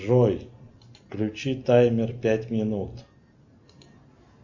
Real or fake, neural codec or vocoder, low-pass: real; none; 7.2 kHz